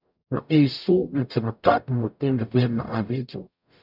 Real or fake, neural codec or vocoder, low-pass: fake; codec, 44.1 kHz, 0.9 kbps, DAC; 5.4 kHz